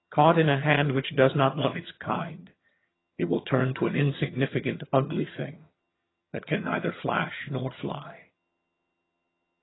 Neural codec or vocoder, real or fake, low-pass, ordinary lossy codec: vocoder, 22.05 kHz, 80 mel bands, HiFi-GAN; fake; 7.2 kHz; AAC, 16 kbps